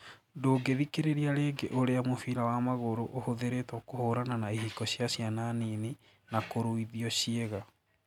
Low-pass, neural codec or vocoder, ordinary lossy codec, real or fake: 19.8 kHz; none; none; real